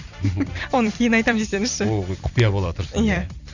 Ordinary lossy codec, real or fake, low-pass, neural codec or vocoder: none; fake; 7.2 kHz; vocoder, 44.1 kHz, 128 mel bands every 256 samples, BigVGAN v2